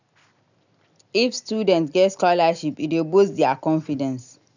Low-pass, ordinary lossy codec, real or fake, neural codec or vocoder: 7.2 kHz; none; real; none